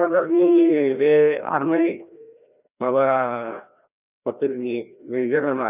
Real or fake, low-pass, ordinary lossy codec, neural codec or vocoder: fake; 3.6 kHz; none; codec, 16 kHz, 1 kbps, FreqCodec, larger model